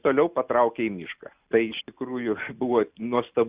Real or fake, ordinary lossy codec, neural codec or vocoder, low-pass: real; Opus, 64 kbps; none; 3.6 kHz